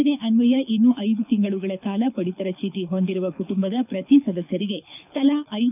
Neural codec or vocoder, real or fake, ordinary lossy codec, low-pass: codec, 24 kHz, 6 kbps, HILCodec; fake; none; 3.6 kHz